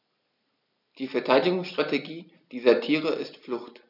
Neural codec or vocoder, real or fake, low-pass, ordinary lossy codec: none; real; 5.4 kHz; none